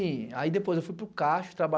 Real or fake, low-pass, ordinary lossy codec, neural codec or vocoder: real; none; none; none